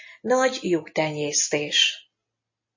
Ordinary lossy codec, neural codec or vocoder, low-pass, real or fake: MP3, 32 kbps; none; 7.2 kHz; real